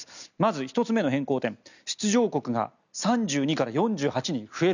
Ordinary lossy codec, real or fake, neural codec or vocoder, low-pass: none; real; none; 7.2 kHz